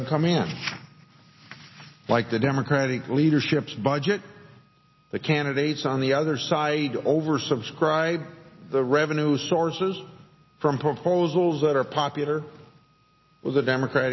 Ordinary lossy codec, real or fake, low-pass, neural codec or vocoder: MP3, 24 kbps; real; 7.2 kHz; none